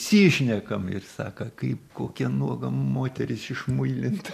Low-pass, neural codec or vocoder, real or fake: 14.4 kHz; none; real